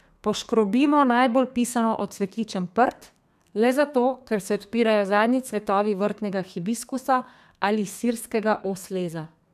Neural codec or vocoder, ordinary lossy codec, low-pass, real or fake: codec, 32 kHz, 1.9 kbps, SNAC; none; 14.4 kHz; fake